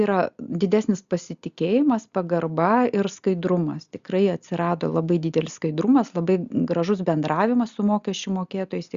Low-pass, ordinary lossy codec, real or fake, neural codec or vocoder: 7.2 kHz; Opus, 64 kbps; real; none